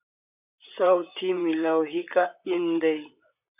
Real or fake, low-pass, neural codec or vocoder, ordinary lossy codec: fake; 3.6 kHz; vocoder, 44.1 kHz, 128 mel bands, Pupu-Vocoder; AAC, 32 kbps